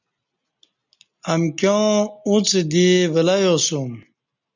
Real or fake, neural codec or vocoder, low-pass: real; none; 7.2 kHz